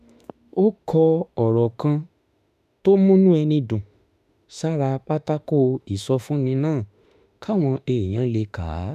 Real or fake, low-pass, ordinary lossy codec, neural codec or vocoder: fake; 14.4 kHz; none; autoencoder, 48 kHz, 32 numbers a frame, DAC-VAE, trained on Japanese speech